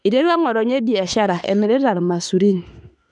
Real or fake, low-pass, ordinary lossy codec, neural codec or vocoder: fake; 10.8 kHz; none; autoencoder, 48 kHz, 32 numbers a frame, DAC-VAE, trained on Japanese speech